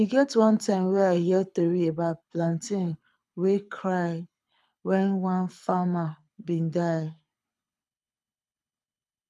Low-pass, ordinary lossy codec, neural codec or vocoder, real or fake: none; none; codec, 24 kHz, 6 kbps, HILCodec; fake